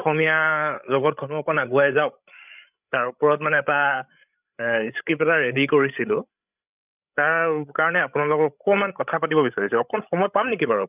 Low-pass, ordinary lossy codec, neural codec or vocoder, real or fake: 3.6 kHz; none; codec, 16 kHz, 16 kbps, FreqCodec, larger model; fake